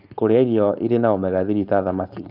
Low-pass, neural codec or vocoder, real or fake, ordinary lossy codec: 5.4 kHz; codec, 16 kHz, 4.8 kbps, FACodec; fake; none